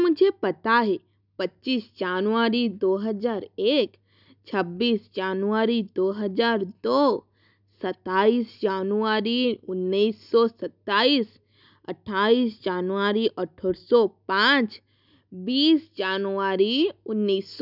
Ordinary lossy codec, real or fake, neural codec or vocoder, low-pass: none; real; none; 5.4 kHz